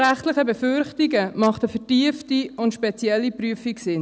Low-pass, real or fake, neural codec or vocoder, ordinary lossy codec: none; real; none; none